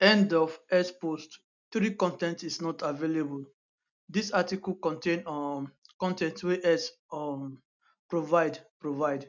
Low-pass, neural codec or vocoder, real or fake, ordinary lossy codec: 7.2 kHz; none; real; none